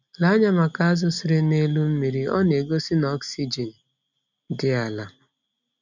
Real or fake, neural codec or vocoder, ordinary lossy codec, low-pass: real; none; none; 7.2 kHz